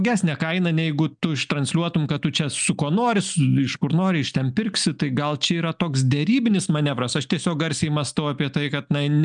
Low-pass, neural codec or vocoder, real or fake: 9.9 kHz; none; real